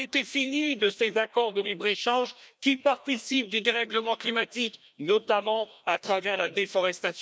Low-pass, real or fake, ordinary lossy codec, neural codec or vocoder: none; fake; none; codec, 16 kHz, 1 kbps, FreqCodec, larger model